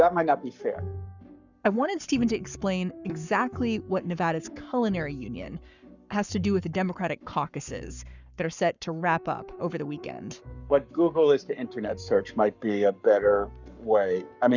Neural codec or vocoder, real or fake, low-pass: codec, 44.1 kHz, 7.8 kbps, Pupu-Codec; fake; 7.2 kHz